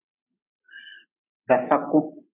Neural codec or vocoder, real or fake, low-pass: none; real; 3.6 kHz